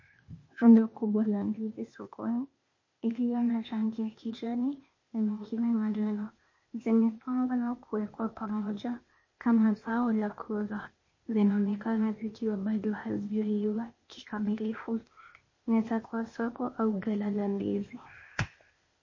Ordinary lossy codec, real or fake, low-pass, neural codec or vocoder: MP3, 32 kbps; fake; 7.2 kHz; codec, 16 kHz, 0.8 kbps, ZipCodec